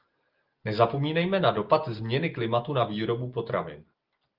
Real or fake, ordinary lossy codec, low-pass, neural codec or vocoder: real; Opus, 32 kbps; 5.4 kHz; none